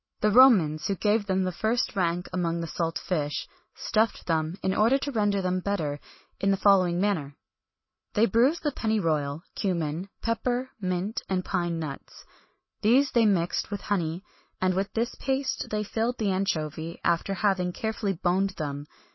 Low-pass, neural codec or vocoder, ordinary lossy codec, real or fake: 7.2 kHz; none; MP3, 24 kbps; real